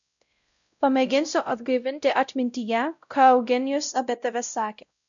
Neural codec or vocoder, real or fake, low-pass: codec, 16 kHz, 0.5 kbps, X-Codec, WavLM features, trained on Multilingual LibriSpeech; fake; 7.2 kHz